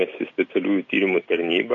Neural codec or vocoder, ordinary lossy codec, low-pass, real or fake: none; AAC, 64 kbps; 7.2 kHz; real